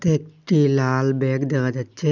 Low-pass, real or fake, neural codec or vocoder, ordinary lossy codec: 7.2 kHz; real; none; none